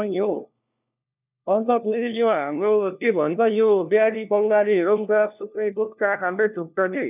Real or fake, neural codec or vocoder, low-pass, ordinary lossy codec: fake; codec, 16 kHz, 1 kbps, FunCodec, trained on LibriTTS, 50 frames a second; 3.6 kHz; none